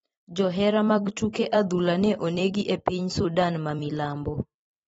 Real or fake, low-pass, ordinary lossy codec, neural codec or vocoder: fake; 19.8 kHz; AAC, 24 kbps; vocoder, 44.1 kHz, 128 mel bands every 256 samples, BigVGAN v2